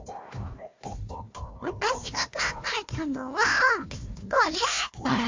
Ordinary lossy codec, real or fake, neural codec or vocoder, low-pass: MP3, 48 kbps; fake; codec, 16 kHz, 1 kbps, FunCodec, trained on Chinese and English, 50 frames a second; 7.2 kHz